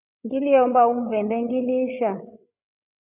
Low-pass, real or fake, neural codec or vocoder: 3.6 kHz; fake; codec, 16 kHz, 8 kbps, FreqCodec, larger model